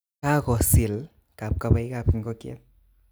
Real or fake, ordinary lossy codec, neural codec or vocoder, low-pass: real; none; none; none